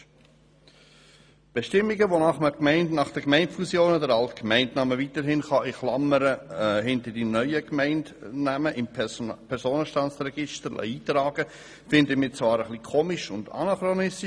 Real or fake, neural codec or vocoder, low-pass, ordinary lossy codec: real; none; none; none